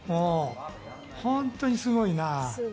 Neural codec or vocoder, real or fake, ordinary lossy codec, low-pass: none; real; none; none